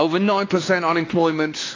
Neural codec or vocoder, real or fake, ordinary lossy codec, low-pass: codec, 16 kHz, 2 kbps, X-Codec, WavLM features, trained on Multilingual LibriSpeech; fake; AAC, 32 kbps; 7.2 kHz